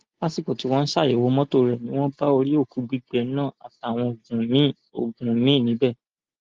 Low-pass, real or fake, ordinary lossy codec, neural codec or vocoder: 7.2 kHz; real; Opus, 32 kbps; none